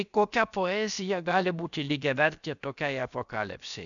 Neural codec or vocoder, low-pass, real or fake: codec, 16 kHz, about 1 kbps, DyCAST, with the encoder's durations; 7.2 kHz; fake